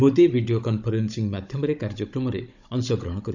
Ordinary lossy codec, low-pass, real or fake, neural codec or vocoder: none; 7.2 kHz; fake; codec, 16 kHz, 16 kbps, FunCodec, trained on Chinese and English, 50 frames a second